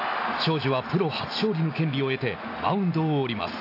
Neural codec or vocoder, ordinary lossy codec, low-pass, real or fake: none; AAC, 32 kbps; 5.4 kHz; real